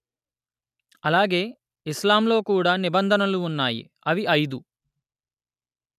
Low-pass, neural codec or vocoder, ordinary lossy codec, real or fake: 14.4 kHz; none; none; real